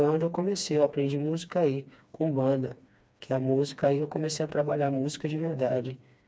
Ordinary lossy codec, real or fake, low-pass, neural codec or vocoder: none; fake; none; codec, 16 kHz, 2 kbps, FreqCodec, smaller model